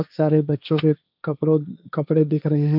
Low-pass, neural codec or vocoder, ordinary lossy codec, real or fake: 5.4 kHz; codec, 16 kHz, 1.1 kbps, Voila-Tokenizer; none; fake